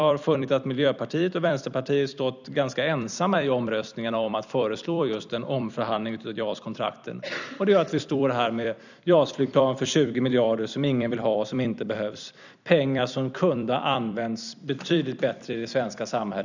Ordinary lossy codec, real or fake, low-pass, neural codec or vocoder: none; fake; 7.2 kHz; vocoder, 44.1 kHz, 128 mel bands every 256 samples, BigVGAN v2